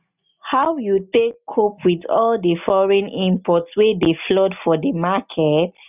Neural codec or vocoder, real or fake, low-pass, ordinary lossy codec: none; real; 3.6 kHz; none